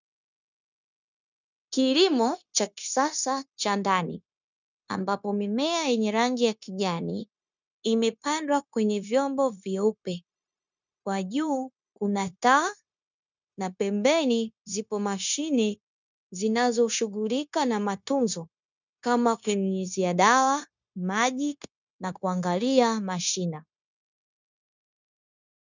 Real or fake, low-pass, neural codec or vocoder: fake; 7.2 kHz; codec, 16 kHz, 0.9 kbps, LongCat-Audio-Codec